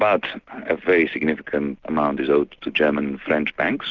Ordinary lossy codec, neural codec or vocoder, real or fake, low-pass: Opus, 24 kbps; none; real; 7.2 kHz